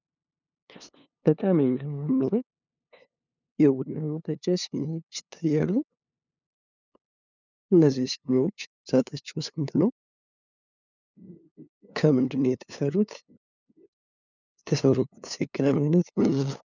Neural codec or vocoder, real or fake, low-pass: codec, 16 kHz, 2 kbps, FunCodec, trained on LibriTTS, 25 frames a second; fake; 7.2 kHz